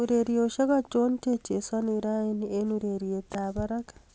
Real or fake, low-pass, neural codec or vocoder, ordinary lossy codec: real; none; none; none